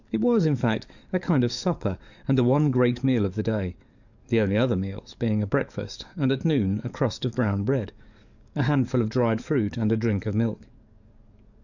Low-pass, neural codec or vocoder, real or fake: 7.2 kHz; codec, 44.1 kHz, 7.8 kbps, DAC; fake